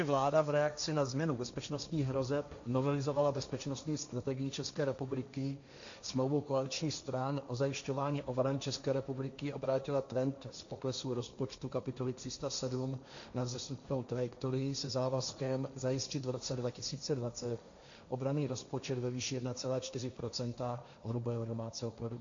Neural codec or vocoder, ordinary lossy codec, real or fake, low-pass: codec, 16 kHz, 1.1 kbps, Voila-Tokenizer; MP3, 64 kbps; fake; 7.2 kHz